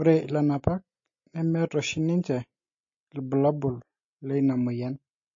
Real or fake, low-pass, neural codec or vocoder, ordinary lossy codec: real; 7.2 kHz; none; MP3, 32 kbps